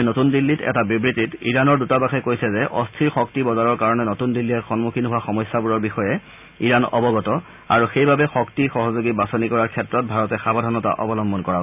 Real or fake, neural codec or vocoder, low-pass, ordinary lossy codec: real; none; 3.6 kHz; none